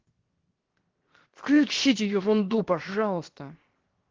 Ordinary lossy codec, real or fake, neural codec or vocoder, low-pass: Opus, 16 kbps; fake; codec, 16 kHz, 0.7 kbps, FocalCodec; 7.2 kHz